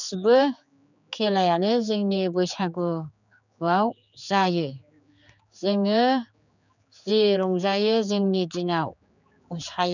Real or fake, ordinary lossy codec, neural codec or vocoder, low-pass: fake; none; codec, 16 kHz, 4 kbps, X-Codec, HuBERT features, trained on general audio; 7.2 kHz